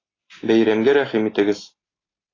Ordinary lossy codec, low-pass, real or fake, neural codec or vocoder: AAC, 32 kbps; 7.2 kHz; real; none